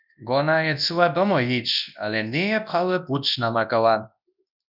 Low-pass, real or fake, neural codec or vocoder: 5.4 kHz; fake; codec, 24 kHz, 0.9 kbps, WavTokenizer, large speech release